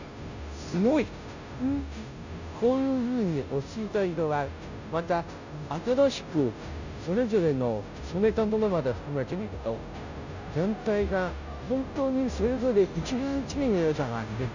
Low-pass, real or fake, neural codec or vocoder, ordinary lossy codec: 7.2 kHz; fake; codec, 16 kHz, 0.5 kbps, FunCodec, trained on Chinese and English, 25 frames a second; none